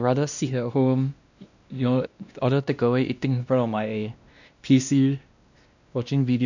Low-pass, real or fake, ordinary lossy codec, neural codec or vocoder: 7.2 kHz; fake; none; codec, 16 kHz, 1 kbps, X-Codec, WavLM features, trained on Multilingual LibriSpeech